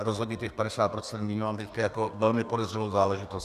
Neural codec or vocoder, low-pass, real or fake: codec, 32 kHz, 1.9 kbps, SNAC; 14.4 kHz; fake